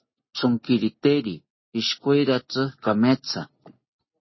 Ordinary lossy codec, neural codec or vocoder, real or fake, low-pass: MP3, 24 kbps; none; real; 7.2 kHz